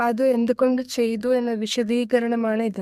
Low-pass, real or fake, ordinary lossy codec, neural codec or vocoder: 14.4 kHz; fake; none; codec, 32 kHz, 1.9 kbps, SNAC